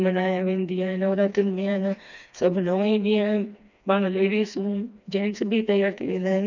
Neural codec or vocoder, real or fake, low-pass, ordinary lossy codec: codec, 16 kHz, 2 kbps, FreqCodec, smaller model; fake; 7.2 kHz; none